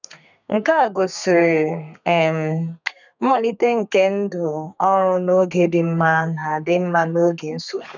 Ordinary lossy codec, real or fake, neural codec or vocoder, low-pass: none; fake; codec, 32 kHz, 1.9 kbps, SNAC; 7.2 kHz